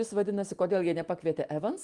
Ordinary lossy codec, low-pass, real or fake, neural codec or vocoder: Opus, 24 kbps; 10.8 kHz; real; none